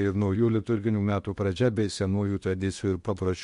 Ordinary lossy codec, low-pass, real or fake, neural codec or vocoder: MP3, 64 kbps; 10.8 kHz; fake; codec, 16 kHz in and 24 kHz out, 0.8 kbps, FocalCodec, streaming, 65536 codes